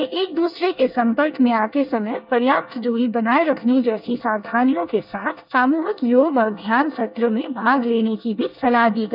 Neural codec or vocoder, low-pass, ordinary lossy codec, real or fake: codec, 24 kHz, 1 kbps, SNAC; 5.4 kHz; none; fake